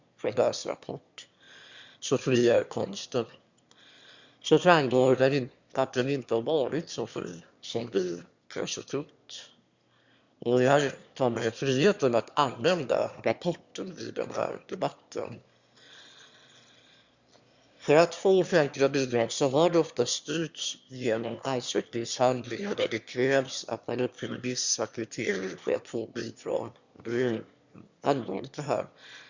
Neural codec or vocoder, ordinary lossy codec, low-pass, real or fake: autoencoder, 22.05 kHz, a latent of 192 numbers a frame, VITS, trained on one speaker; Opus, 64 kbps; 7.2 kHz; fake